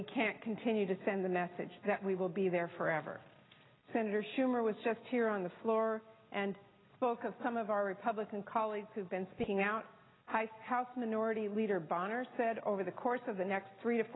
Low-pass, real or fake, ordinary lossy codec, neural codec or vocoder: 7.2 kHz; real; AAC, 16 kbps; none